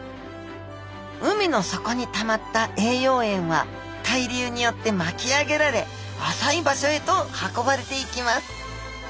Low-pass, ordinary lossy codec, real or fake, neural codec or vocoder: none; none; real; none